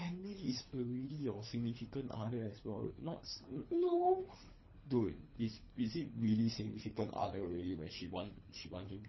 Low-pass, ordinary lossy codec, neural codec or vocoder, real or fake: 7.2 kHz; MP3, 24 kbps; codec, 24 kHz, 3 kbps, HILCodec; fake